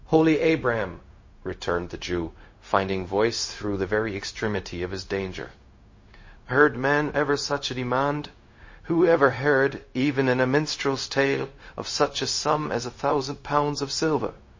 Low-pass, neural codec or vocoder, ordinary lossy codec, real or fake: 7.2 kHz; codec, 16 kHz, 0.4 kbps, LongCat-Audio-Codec; MP3, 32 kbps; fake